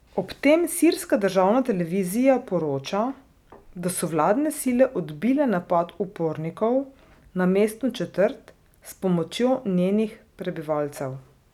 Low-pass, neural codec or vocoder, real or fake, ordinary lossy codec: 19.8 kHz; none; real; none